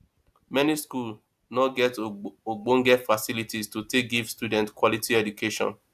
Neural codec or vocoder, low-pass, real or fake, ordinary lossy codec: none; 14.4 kHz; real; AAC, 96 kbps